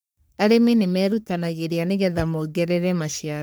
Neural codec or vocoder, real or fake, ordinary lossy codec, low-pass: codec, 44.1 kHz, 3.4 kbps, Pupu-Codec; fake; none; none